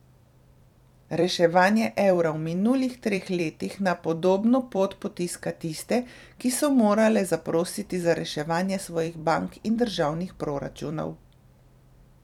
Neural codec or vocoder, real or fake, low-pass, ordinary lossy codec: vocoder, 44.1 kHz, 128 mel bands every 256 samples, BigVGAN v2; fake; 19.8 kHz; none